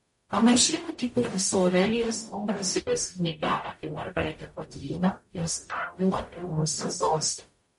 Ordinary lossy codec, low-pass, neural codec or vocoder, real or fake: MP3, 48 kbps; 19.8 kHz; codec, 44.1 kHz, 0.9 kbps, DAC; fake